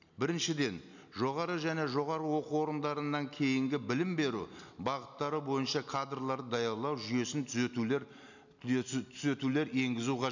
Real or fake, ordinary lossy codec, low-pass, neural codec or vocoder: real; none; 7.2 kHz; none